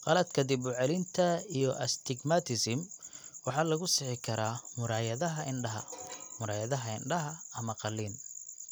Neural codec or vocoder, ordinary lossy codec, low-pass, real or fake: none; none; none; real